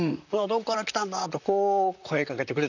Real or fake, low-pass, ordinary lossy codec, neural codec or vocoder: fake; 7.2 kHz; none; vocoder, 44.1 kHz, 128 mel bands, Pupu-Vocoder